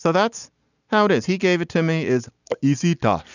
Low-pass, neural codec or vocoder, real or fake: 7.2 kHz; none; real